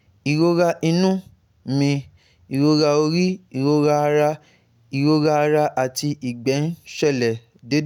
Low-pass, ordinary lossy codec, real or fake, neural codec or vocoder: 19.8 kHz; none; real; none